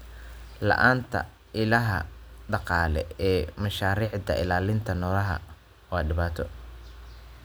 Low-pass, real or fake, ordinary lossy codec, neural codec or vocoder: none; real; none; none